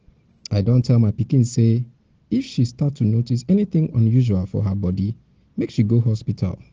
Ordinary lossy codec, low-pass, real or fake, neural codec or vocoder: Opus, 16 kbps; 7.2 kHz; real; none